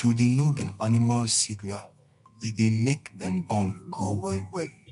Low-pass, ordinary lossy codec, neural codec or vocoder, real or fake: 10.8 kHz; MP3, 64 kbps; codec, 24 kHz, 0.9 kbps, WavTokenizer, medium music audio release; fake